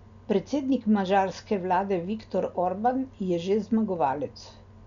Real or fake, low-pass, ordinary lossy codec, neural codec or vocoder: real; 7.2 kHz; none; none